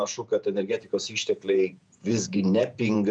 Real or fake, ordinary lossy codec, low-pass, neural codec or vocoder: fake; MP3, 96 kbps; 9.9 kHz; vocoder, 48 kHz, 128 mel bands, Vocos